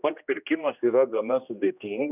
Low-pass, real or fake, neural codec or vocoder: 3.6 kHz; fake; codec, 16 kHz, 1 kbps, X-Codec, HuBERT features, trained on general audio